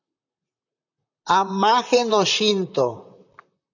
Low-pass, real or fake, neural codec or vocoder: 7.2 kHz; fake; vocoder, 44.1 kHz, 128 mel bands, Pupu-Vocoder